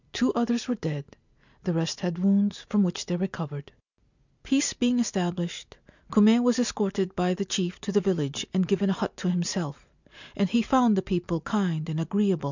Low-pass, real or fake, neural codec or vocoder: 7.2 kHz; real; none